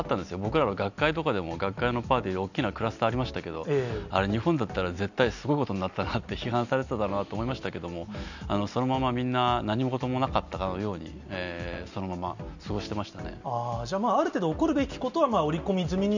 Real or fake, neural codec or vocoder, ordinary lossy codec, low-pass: real; none; none; 7.2 kHz